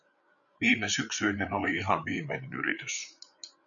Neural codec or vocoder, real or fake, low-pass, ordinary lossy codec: codec, 16 kHz, 8 kbps, FreqCodec, larger model; fake; 7.2 kHz; MP3, 96 kbps